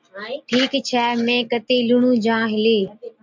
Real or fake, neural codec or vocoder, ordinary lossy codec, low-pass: real; none; MP3, 64 kbps; 7.2 kHz